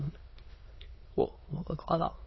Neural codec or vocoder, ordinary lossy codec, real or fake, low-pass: autoencoder, 22.05 kHz, a latent of 192 numbers a frame, VITS, trained on many speakers; MP3, 24 kbps; fake; 7.2 kHz